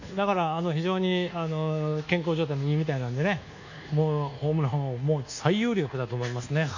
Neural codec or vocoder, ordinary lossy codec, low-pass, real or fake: codec, 24 kHz, 1.2 kbps, DualCodec; none; 7.2 kHz; fake